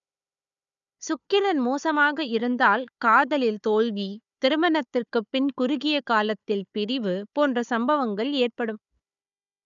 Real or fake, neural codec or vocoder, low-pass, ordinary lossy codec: fake; codec, 16 kHz, 4 kbps, FunCodec, trained on Chinese and English, 50 frames a second; 7.2 kHz; none